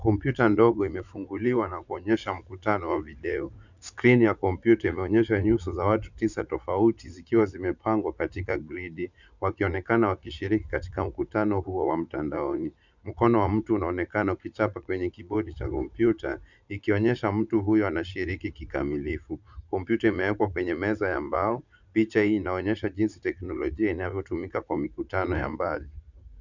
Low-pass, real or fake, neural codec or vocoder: 7.2 kHz; fake; vocoder, 44.1 kHz, 80 mel bands, Vocos